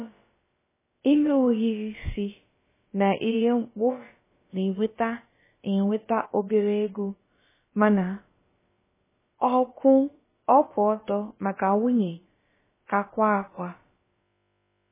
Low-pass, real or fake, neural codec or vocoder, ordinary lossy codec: 3.6 kHz; fake; codec, 16 kHz, about 1 kbps, DyCAST, with the encoder's durations; MP3, 16 kbps